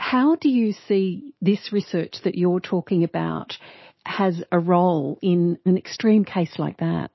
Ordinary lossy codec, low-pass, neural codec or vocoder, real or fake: MP3, 24 kbps; 7.2 kHz; autoencoder, 48 kHz, 128 numbers a frame, DAC-VAE, trained on Japanese speech; fake